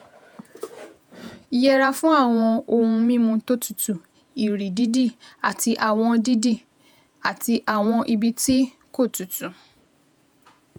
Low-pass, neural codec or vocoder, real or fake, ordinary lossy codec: 19.8 kHz; vocoder, 48 kHz, 128 mel bands, Vocos; fake; none